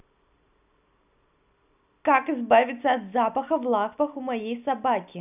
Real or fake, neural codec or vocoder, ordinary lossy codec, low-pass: real; none; none; 3.6 kHz